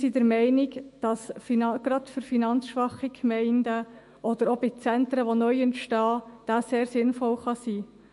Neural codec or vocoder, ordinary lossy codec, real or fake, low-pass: autoencoder, 48 kHz, 128 numbers a frame, DAC-VAE, trained on Japanese speech; MP3, 48 kbps; fake; 14.4 kHz